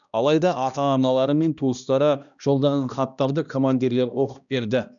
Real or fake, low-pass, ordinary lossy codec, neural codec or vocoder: fake; 7.2 kHz; none; codec, 16 kHz, 1 kbps, X-Codec, HuBERT features, trained on balanced general audio